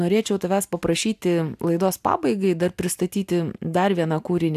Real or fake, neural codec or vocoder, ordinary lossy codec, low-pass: real; none; AAC, 96 kbps; 14.4 kHz